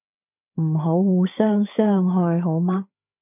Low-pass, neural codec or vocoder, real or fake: 3.6 kHz; codec, 16 kHz, 16 kbps, FreqCodec, larger model; fake